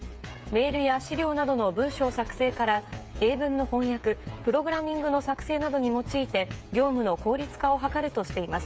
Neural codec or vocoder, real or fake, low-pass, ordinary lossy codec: codec, 16 kHz, 8 kbps, FreqCodec, smaller model; fake; none; none